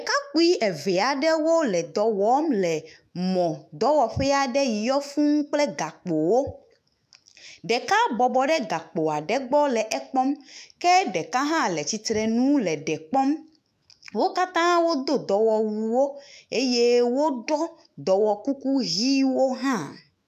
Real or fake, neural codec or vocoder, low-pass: fake; autoencoder, 48 kHz, 128 numbers a frame, DAC-VAE, trained on Japanese speech; 14.4 kHz